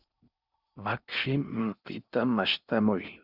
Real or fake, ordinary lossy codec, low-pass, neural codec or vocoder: fake; Opus, 64 kbps; 5.4 kHz; codec, 16 kHz in and 24 kHz out, 0.6 kbps, FocalCodec, streaming, 4096 codes